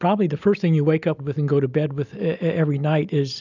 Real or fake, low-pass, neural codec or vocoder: real; 7.2 kHz; none